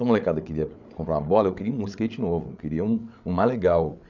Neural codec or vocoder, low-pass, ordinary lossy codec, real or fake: codec, 16 kHz, 8 kbps, FreqCodec, larger model; 7.2 kHz; none; fake